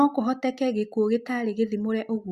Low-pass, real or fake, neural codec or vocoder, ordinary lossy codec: 14.4 kHz; real; none; Opus, 64 kbps